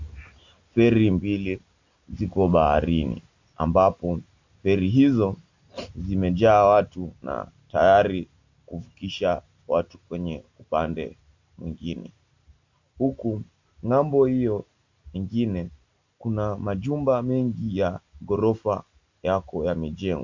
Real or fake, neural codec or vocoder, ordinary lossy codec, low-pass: real; none; MP3, 48 kbps; 7.2 kHz